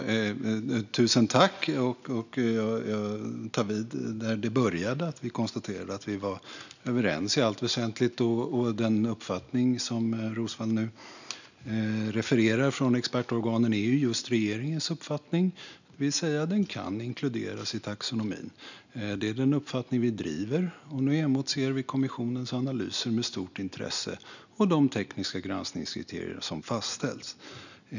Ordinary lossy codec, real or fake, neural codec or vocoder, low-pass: none; real; none; 7.2 kHz